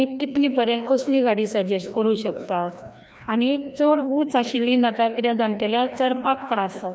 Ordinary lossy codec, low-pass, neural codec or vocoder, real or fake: none; none; codec, 16 kHz, 1 kbps, FreqCodec, larger model; fake